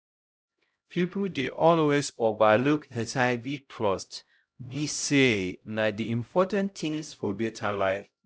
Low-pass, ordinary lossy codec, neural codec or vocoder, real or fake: none; none; codec, 16 kHz, 0.5 kbps, X-Codec, HuBERT features, trained on LibriSpeech; fake